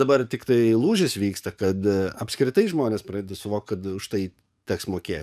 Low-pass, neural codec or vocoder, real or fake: 14.4 kHz; codec, 44.1 kHz, 7.8 kbps, DAC; fake